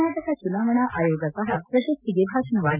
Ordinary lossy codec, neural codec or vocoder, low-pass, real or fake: none; none; 3.6 kHz; real